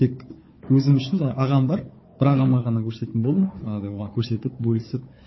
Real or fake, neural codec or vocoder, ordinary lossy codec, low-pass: fake; codec, 16 kHz, 4 kbps, FunCodec, trained on Chinese and English, 50 frames a second; MP3, 24 kbps; 7.2 kHz